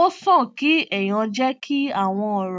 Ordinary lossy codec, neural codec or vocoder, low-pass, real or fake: none; none; none; real